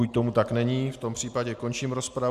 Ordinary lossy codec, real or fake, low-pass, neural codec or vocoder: MP3, 96 kbps; real; 14.4 kHz; none